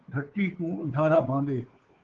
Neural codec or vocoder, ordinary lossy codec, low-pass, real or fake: codec, 16 kHz, 2 kbps, FunCodec, trained on Chinese and English, 25 frames a second; Opus, 16 kbps; 7.2 kHz; fake